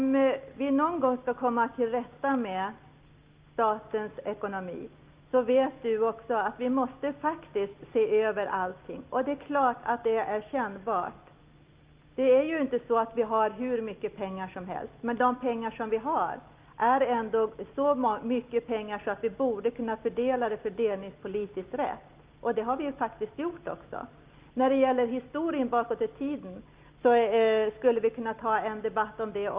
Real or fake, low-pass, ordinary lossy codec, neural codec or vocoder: real; 3.6 kHz; Opus, 24 kbps; none